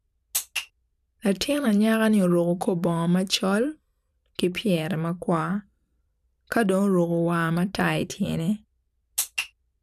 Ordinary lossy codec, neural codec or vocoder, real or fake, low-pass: none; vocoder, 44.1 kHz, 128 mel bands every 512 samples, BigVGAN v2; fake; 14.4 kHz